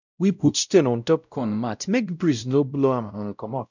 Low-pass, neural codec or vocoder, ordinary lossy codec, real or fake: 7.2 kHz; codec, 16 kHz, 0.5 kbps, X-Codec, WavLM features, trained on Multilingual LibriSpeech; none; fake